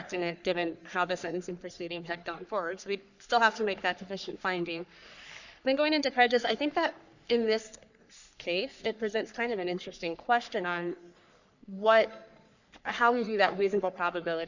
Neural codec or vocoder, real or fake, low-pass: codec, 44.1 kHz, 3.4 kbps, Pupu-Codec; fake; 7.2 kHz